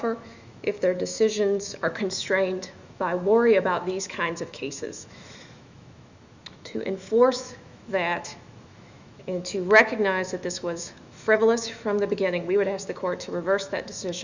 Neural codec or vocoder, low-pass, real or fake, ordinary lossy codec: autoencoder, 48 kHz, 128 numbers a frame, DAC-VAE, trained on Japanese speech; 7.2 kHz; fake; Opus, 64 kbps